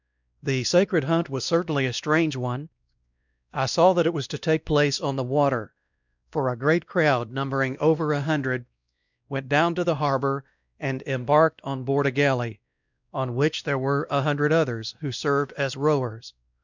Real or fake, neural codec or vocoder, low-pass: fake; codec, 16 kHz, 1 kbps, X-Codec, WavLM features, trained on Multilingual LibriSpeech; 7.2 kHz